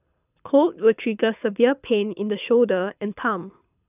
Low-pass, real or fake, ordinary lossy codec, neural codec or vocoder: 3.6 kHz; fake; none; codec, 24 kHz, 6 kbps, HILCodec